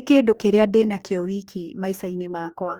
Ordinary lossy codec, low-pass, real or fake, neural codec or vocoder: Opus, 64 kbps; 19.8 kHz; fake; codec, 44.1 kHz, 2.6 kbps, DAC